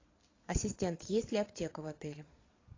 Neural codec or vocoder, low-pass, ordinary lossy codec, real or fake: vocoder, 22.05 kHz, 80 mel bands, Vocos; 7.2 kHz; AAC, 32 kbps; fake